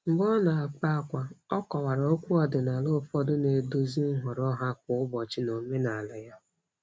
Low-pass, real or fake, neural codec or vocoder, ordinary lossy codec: none; real; none; none